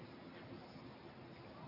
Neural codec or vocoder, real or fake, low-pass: none; real; 5.4 kHz